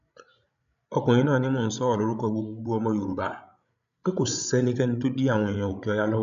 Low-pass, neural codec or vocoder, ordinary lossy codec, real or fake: 7.2 kHz; codec, 16 kHz, 16 kbps, FreqCodec, larger model; none; fake